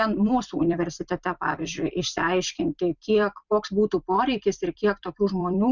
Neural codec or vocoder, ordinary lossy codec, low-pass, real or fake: vocoder, 44.1 kHz, 128 mel bands every 256 samples, BigVGAN v2; Opus, 64 kbps; 7.2 kHz; fake